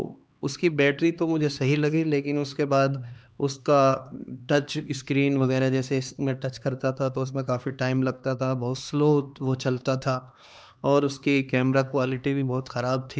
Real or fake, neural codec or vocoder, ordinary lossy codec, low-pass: fake; codec, 16 kHz, 2 kbps, X-Codec, HuBERT features, trained on LibriSpeech; none; none